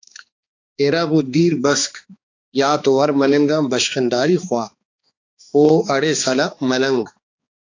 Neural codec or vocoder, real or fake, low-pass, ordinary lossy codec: codec, 16 kHz, 2 kbps, X-Codec, HuBERT features, trained on balanced general audio; fake; 7.2 kHz; AAC, 48 kbps